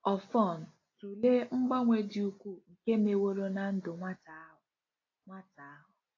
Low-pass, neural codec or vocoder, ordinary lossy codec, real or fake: 7.2 kHz; none; AAC, 32 kbps; real